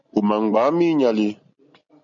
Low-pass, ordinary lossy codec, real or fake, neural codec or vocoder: 7.2 kHz; MP3, 48 kbps; real; none